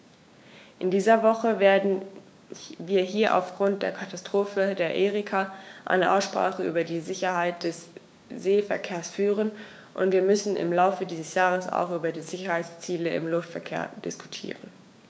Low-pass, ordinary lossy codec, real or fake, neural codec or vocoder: none; none; fake; codec, 16 kHz, 6 kbps, DAC